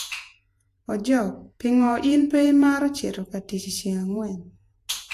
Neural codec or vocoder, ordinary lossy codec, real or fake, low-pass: vocoder, 48 kHz, 128 mel bands, Vocos; AAC, 64 kbps; fake; 14.4 kHz